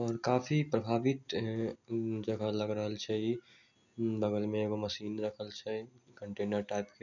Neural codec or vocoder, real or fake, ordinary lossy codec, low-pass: none; real; none; 7.2 kHz